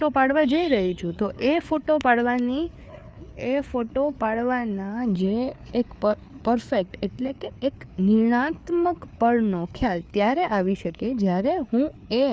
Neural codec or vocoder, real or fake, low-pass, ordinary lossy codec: codec, 16 kHz, 4 kbps, FreqCodec, larger model; fake; none; none